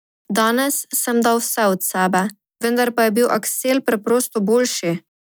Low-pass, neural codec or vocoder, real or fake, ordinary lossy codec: none; none; real; none